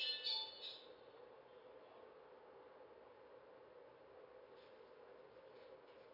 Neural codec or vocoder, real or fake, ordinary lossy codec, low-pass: none; real; none; 5.4 kHz